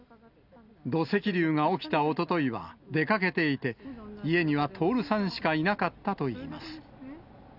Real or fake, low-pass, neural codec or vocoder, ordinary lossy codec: real; 5.4 kHz; none; none